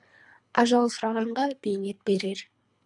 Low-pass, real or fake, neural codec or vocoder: 10.8 kHz; fake; codec, 24 kHz, 3 kbps, HILCodec